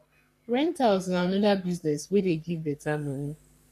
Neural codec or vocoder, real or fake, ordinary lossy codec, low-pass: codec, 44.1 kHz, 3.4 kbps, Pupu-Codec; fake; none; 14.4 kHz